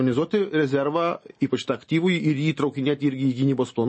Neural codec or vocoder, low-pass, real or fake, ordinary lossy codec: none; 10.8 kHz; real; MP3, 32 kbps